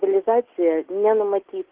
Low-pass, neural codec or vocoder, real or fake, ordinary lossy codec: 3.6 kHz; none; real; Opus, 16 kbps